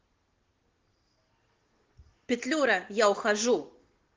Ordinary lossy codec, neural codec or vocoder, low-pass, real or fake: Opus, 16 kbps; none; 7.2 kHz; real